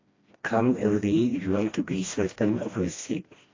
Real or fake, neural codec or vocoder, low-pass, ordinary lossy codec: fake; codec, 16 kHz, 1 kbps, FreqCodec, smaller model; 7.2 kHz; AAC, 32 kbps